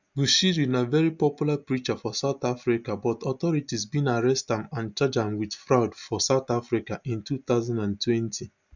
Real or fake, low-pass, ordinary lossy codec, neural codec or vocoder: real; 7.2 kHz; none; none